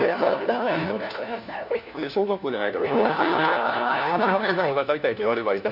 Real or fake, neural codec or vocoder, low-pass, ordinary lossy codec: fake; codec, 16 kHz, 1 kbps, FunCodec, trained on LibriTTS, 50 frames a second; 5.4 kHz; none